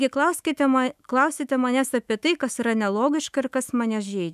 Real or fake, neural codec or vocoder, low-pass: fake; autoencoder, 48 kHz, 128 numbers a frame, DAC-VAE, trained on Japanese speech; 14.4 kHz